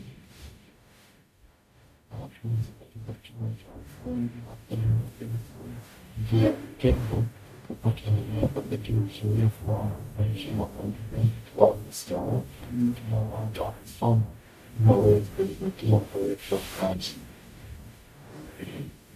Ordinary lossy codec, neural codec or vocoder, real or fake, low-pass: MP3, 96 kbps; codec, 44.1 kHz, 0.9 kbps, DAC; fake; 14.4 kHz